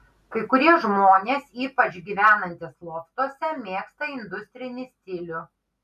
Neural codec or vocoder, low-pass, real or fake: none; 14.4 kHz; real